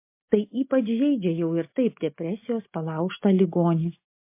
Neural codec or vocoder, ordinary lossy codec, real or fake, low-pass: none; MP3, 24 kbps; real; 3.6 kHz